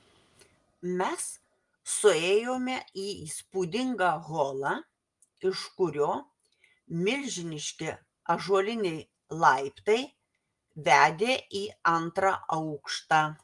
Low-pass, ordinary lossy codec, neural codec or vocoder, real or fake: 10.8 kHz; Opus, 32 kbps; none; real